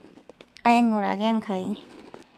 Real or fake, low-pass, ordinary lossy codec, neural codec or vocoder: fake; 14.4 kHz; none; codec, 32 kHz, 1.9 kbps, SNAC